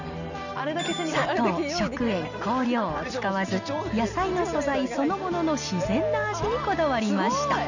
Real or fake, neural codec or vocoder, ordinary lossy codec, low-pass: real; none; none; 7.2 kHz